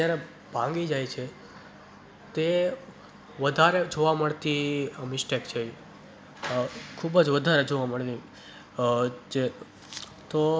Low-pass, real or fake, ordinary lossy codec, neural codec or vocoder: none; real; none; none